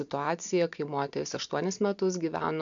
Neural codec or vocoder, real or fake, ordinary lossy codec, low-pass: none; real; MP3, 64 kbps; 7.2 kHz